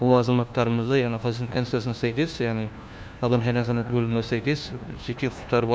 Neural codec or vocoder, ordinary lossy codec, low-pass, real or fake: codec, 16 kHz, 1 kbps, FunCodec, trained on LibriTTS, 50 frames a second; none; none; fake